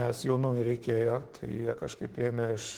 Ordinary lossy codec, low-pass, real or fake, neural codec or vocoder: Opus, 16 kbps; 19.8 kHz; fake; autoencoder, 48 kHz, 32 numbers a frame, DAC-VAE, trained on Japanese speech